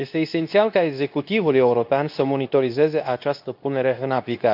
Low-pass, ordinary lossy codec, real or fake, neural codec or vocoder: 5.4 kHz; none; fake; codec, 24 kHz, 0.9 kbps, WavTokenizer, medium speech release version 2